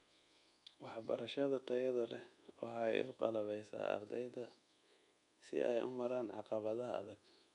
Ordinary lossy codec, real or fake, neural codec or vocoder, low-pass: none; fake; codec, 24 kHz, 1.2 kbps, DualCodec; 10.8 kHz